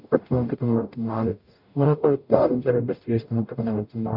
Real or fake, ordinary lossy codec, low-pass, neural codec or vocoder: fake; none; 5.4 kHz; codec, 44.1 kHz, 0.9 kbps, DAC